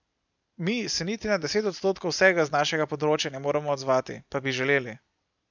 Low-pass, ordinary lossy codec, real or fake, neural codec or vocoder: 7.2 kHz; none; real; none